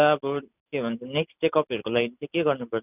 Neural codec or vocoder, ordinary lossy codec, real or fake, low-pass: none; none; real; 3.6 kHz